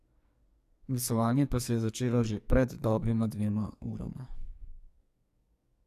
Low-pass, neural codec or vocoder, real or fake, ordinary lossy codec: 14.4 kHz; codec, 44.1 kHz, 2.6 kbps, SNAC; fake; AAC, 96 kbps